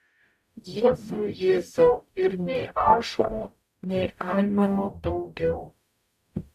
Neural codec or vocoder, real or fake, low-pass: codec, 44.1 kHz, 0.9 kbps, DAC; fake; 14.4 kHz